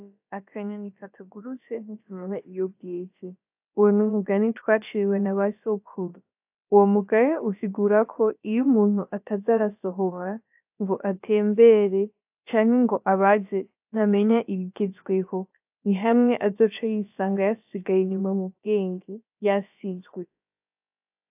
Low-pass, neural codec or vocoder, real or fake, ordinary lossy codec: 3.6 kHz; codec, 16 kHz, about 1 kbps, DyCAST, with the encoder's durations; fake; AAC, 32 kbps